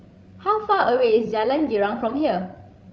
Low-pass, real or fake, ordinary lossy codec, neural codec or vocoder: none; fake; none; codec, 16 kHz, 16 kbps, FreqCodec, larger model